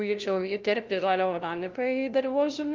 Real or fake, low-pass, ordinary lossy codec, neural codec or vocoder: fake; 7.2 kHz; Opus, 32 kbps; codec, 16 kHz, 0.5 kbps, FunCodec, trained on LibriTTS, 25 frames a second